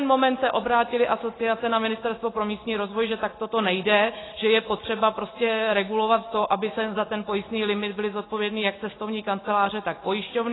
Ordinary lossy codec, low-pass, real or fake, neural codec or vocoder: AAC, 16 kbps; 7.2 kHz; real; none